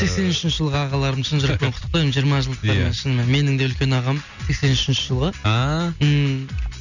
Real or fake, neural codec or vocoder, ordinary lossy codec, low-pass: real; none; none; 7.2 kHz